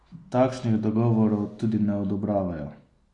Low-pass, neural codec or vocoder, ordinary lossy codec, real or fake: 10.8 kHz; none; AAC, 48 kbps; real